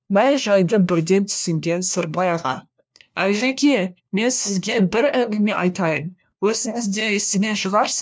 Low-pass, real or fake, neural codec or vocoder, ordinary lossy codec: none; fake; codec, 16 kHz, 1 kbps, FunCodec, trained on LibriTTS, 50 frames a second; none